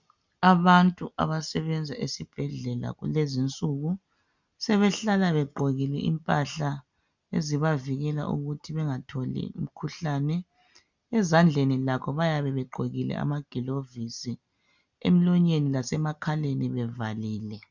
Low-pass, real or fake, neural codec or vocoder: 7.2 kHz; real; none